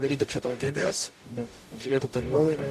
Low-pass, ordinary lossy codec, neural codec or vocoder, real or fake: 14.4 kHz; MP3, 64 kbps; codec, 44.1 kHz, 0.9 kbps, DAC; fake